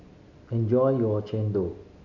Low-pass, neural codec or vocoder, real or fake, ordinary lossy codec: 7.2 kHz; none; real; none